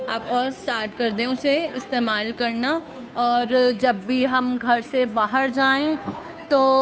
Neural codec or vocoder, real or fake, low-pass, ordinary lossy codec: codec, 16 kHz, 2 kbps, FunCodec, trained on Chinese and English, 25 frames a second; fake; none; none